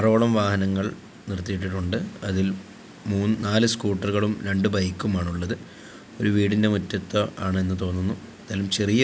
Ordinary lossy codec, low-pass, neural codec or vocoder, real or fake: none; none; none; real